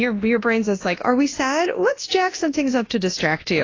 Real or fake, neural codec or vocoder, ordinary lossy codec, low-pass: fake; codec, 24 kHz, 0.9 kbps, WavTokenizer, large speech release; AAC, 32 kbps; 7.2 kHz